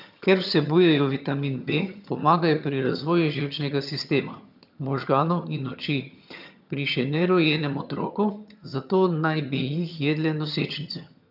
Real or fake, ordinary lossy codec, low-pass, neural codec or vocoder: fake; none; 5.4 kHz; vocoder, 22.05 kHz, 80 mel bands, HiFi-GAN